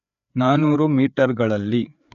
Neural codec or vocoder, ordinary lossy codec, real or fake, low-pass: codec, 16 kHz, 4 kbps, FreqCodec, larger model; none; fake; 7.2 kHz